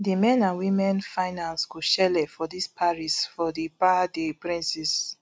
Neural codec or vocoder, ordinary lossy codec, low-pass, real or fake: none; none; none; real